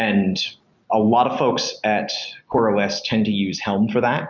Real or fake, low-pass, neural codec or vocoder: real; 7.2 kHz; none